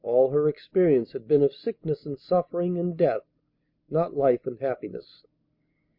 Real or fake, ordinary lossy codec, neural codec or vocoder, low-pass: real; MP3, 32 kbps; none; 5.4 kHz